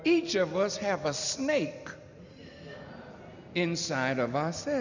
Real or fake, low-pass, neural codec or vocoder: real; 7.2 kHz; none